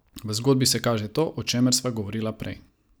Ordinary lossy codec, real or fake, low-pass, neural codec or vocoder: none; real; none; none